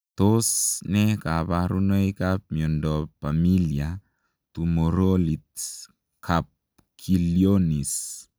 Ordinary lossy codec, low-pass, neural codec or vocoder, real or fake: none; none; none; real